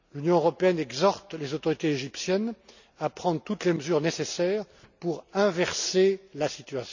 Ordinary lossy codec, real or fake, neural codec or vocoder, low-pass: none; real; none; 7.2 kHz